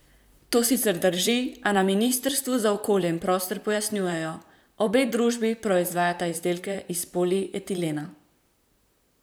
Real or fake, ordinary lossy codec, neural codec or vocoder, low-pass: fake; none; vocoder, 44.1 kHz, 128 mel bands, Pupu-Vocoder; none